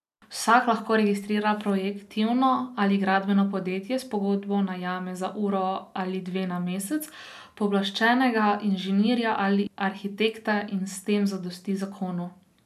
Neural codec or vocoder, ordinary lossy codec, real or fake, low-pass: none; none; real; 14.4 kHz